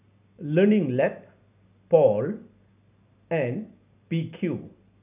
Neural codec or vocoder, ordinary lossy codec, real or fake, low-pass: none; none; real; 3.6 kHz